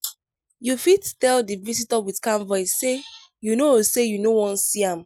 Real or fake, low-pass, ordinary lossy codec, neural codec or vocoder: real; 19.8 kHz; none; none